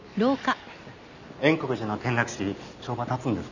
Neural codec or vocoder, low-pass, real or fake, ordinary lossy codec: none; 7.2 kHz; real; none